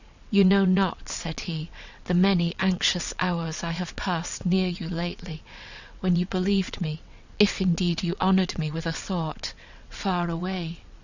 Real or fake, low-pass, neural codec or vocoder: fake; 7.2 kHz; vocoder, 22.05 kHz, 80 mel bands, WaveNeXt